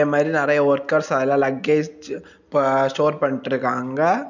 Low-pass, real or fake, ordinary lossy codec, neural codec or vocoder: 7.2 kHz; real; none; none